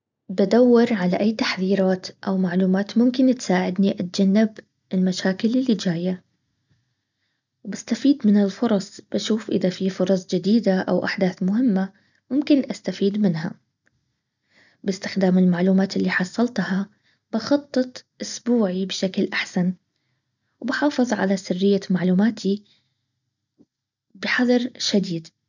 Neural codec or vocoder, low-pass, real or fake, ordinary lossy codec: none; 7.2 kHz; real; none